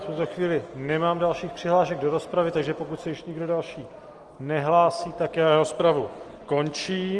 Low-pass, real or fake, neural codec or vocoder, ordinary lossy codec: 10.8 kHz; real; none; Opus, 24 kbps